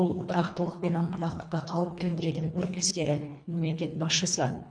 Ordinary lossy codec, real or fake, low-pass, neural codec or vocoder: none; fake; 9.9 kHz; codec, 24 kHz, 1.5 kbps, HILCodec